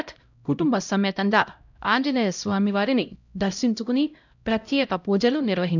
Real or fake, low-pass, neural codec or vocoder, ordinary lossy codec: fake; 7.2 kHz; codec, 16 kHz, 0.5 kbps, X-Codec, HuBERT features, trained on LibriSpeech; none